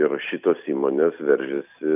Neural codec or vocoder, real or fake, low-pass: vocoder, 44.1 kHz, 128 mel bands every 256 samples, BigVGAN v2; fake; 3.6 kHz